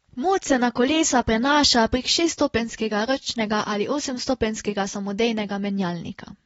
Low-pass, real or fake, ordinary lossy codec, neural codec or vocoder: 19.8 kHz; real; AAC, 24 kbps; none